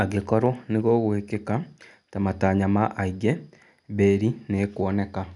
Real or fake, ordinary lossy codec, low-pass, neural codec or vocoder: real; none; 10.8 kHz; none